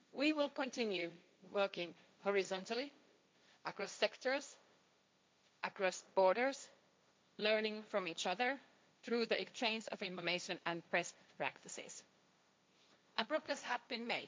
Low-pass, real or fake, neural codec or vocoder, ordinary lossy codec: none; fake; codec, 16 kHz, 1.1 kbps, Voila-Tokenizer; none